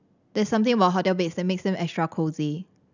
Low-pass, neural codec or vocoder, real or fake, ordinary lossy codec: 7.2 kHz; none; real; none